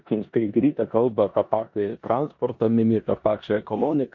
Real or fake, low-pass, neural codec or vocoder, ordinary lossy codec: fake; 7.2 kHz; codec, 16 kHz in and 24 kHz out, 0.9 kbps, LongCat-Audio-Codec, four codebook decoder; MP3, 48 kbps